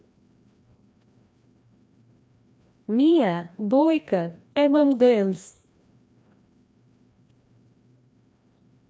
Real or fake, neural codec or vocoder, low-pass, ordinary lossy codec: fake; codec, 16 kHz, 1 kbps, FreqCodec, larger model; none; none